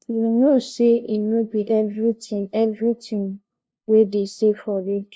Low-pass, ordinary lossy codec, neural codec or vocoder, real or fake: none; none; codec, 16 kHz, 0.5 kbps, FunCodec, trained on LibriTTS, 25 frames a second; fake